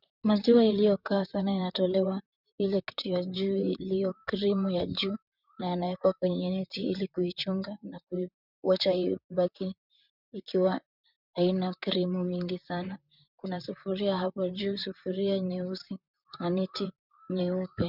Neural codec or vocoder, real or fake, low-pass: vocoder, 44.1 kHz, 128 mel bands, Pupu-Vocoder; fake; 5.4 kHz